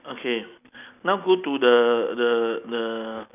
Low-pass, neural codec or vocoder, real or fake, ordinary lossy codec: 3.6 kHz; none; real; none